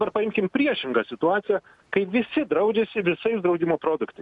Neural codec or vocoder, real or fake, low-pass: none; real; 10.8 kHz